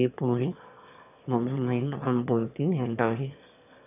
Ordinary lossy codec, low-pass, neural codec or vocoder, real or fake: none; 3.6 kHz; autoencoder, 22.05 kHz, a latent of 192 numbers a frame, VITS, trained on one speaker; fake